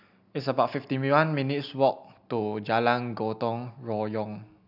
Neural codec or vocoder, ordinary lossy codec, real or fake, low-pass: none; none; real; 5.4 kHz